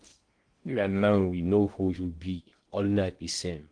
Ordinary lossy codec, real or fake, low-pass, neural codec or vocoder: Opus, 24 kbps; fake; 9.9 kHz; codec, 16 kHz in and 24 kHz out, 0.6 kbps, FocalCodec, streaming, 2048 codes